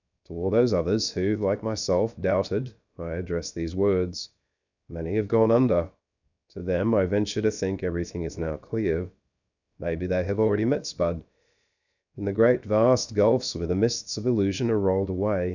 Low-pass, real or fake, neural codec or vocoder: 7.2 kHz; fake; codec, 16 kHz, 0.7 kbps, FocalCodec